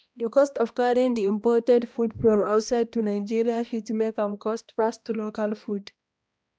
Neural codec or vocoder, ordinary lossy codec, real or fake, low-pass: codec, 16 kHz, 1 kbps, X-Codec, HuBERT features, trained on balanced general audio; none; fake; none